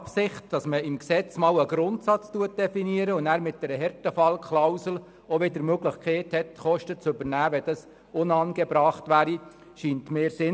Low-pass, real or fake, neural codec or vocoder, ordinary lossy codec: none; real; none; none